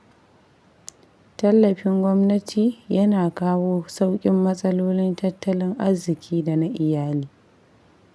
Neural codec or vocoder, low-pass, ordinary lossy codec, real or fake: none; none; none; real